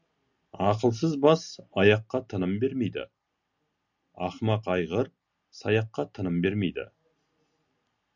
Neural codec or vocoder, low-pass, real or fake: none; 7.2 kHz; real